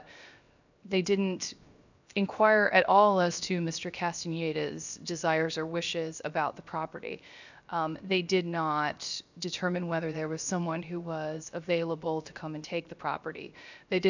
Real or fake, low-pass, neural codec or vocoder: fake; 7.2 kHz; codec, 16 kHz, 0.7 kbps, FocalCodec